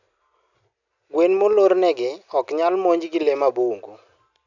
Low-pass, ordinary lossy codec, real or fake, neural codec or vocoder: 7.2 kHz; none; real; none